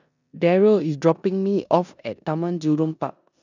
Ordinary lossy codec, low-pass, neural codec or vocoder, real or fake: none; 7.2 kHz; codec, 16 kHz in and 24 kHz out, 0.9 kbps, LongCat-Audio-Codec, four codebook decoder; fake